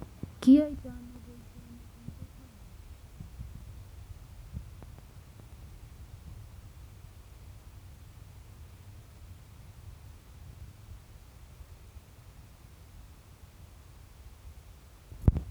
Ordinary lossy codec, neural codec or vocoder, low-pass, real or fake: none; none; none; real